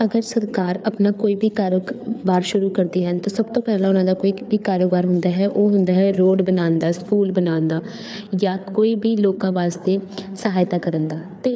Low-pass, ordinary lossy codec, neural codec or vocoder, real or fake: none; none; codec, 16 kHz, 4 kbps, FreqCodec, larger model; fake